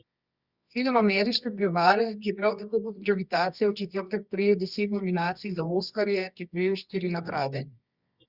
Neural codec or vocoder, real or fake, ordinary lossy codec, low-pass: codec, 24 kHz, 0.9 kbps, WavTokenizer, medium music audio release; fake; Opus, 64 kbps; 5.4 kHz